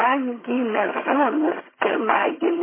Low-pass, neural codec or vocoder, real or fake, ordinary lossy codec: 3.6 kHz; vocoder, 22.05 kHz, 80 mel bands, HiFi-GAN; fake; MP3, 16 kbps